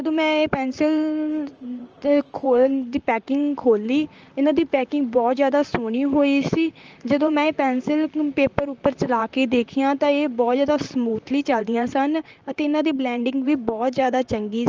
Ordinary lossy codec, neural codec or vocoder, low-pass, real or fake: Opus, 24 kbps; vocoder, 44.1 kHz, 128 mel bands, Pupu-Vocoder; 7.2 kHz; fake